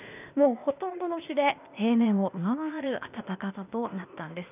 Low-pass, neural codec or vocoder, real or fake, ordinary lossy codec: 3.6 kHz; codec, 16 kHz, 0.8 kbps, ZipCodec; fake; none